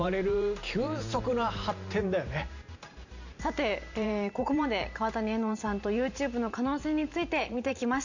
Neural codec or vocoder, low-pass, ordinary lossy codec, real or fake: vocoder, 44.1 kHz, 128 mel bands every 512 samples, BigVGAN v2; 7.2 kHz; none; fake